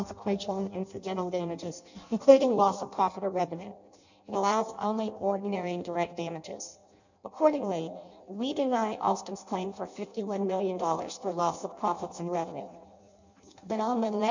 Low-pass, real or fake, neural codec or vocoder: 7.2 kHz; fake; codec, 16 kHz in and 24 kHz out, 0.6 kbps, FireRedTTS-2 codec